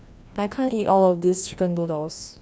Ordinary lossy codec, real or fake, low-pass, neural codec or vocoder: none; fake; none; codec, 16 kHz, 1 kbps, FreqCodec, larger model